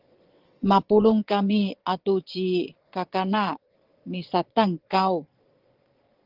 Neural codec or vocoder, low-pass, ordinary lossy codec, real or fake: vocoder, 22.05 kHz, 80 mel bands, Vocos; 5.4 kHz; Opus, 16 kbps; fake